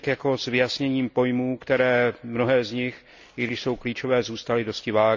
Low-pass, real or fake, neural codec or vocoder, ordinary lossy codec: 7.2 kHz; real; none; none